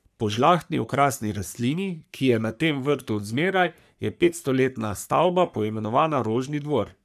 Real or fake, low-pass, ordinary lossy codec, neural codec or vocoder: fake; 14.4 kHz; none; codec, 44.1 kHz, 3.4 kbps, Pupu-Codec